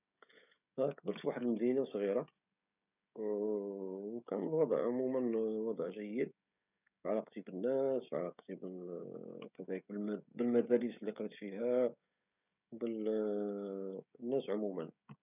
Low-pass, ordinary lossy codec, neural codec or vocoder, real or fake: 3.6 kHz; none; codec, 16 kHz, 16 kbps, FreqCodec, smaller model; fake